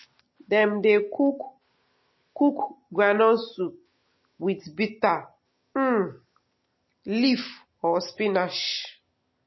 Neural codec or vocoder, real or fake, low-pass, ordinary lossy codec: none; real; 7.2 kHz; MP3, 24 kbps